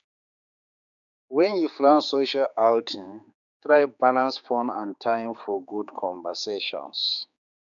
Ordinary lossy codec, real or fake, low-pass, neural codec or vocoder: none; fake; 7.2 kHz; codec, 16 kHz, 4 kbps, X-Codec, HuBERT features, trained on general audio